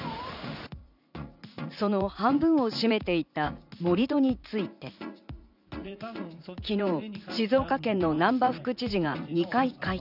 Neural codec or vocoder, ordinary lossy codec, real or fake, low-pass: vocoder, 44.1 kHz, 80 mel bands, Vocos; none; fake; 5.4 kHz